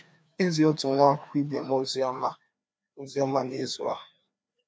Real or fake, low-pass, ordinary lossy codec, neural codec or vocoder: fake; none; none; codec, 16 kHz, 2 kbps, FreqCodec, larger model